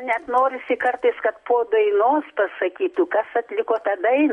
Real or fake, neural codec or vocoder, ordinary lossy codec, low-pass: real; none; MP3, 64 kbps; 10.8 kHz